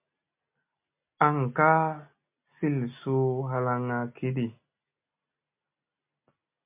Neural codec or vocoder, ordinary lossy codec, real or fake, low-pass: none; MP3, 32 kbps; real; 3.6 kHz